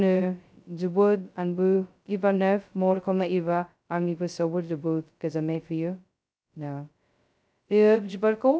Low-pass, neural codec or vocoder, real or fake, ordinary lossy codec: none; codec, 16 kHz, 0.2 kbps, FocalCodec; fake; none